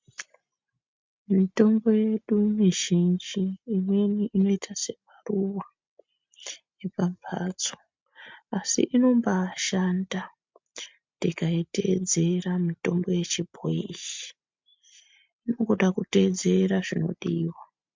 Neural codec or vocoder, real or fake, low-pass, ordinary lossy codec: none; real; 7.2 kHz; MP3, 64 kbps